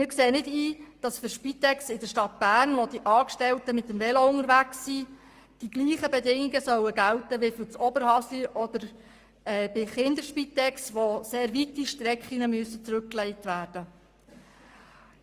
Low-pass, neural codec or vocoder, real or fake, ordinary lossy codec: 14.4 kHz; codec, 44.1 kHz, 7.8 kbps, Pupu-Codec; fake; Opus, 32 kbps